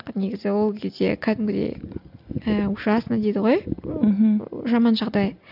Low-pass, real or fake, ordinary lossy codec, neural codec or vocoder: 5.4 kHz; real; none; none